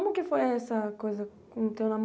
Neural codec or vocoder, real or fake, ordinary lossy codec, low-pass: none; real; none; none